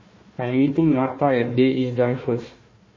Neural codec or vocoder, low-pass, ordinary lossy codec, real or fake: codec, 16 kHz, 1 kbps, FunCodec, trained on Chinese and English, 50 frames a second; 7.2 kHz; MP3, 32 kbps; fake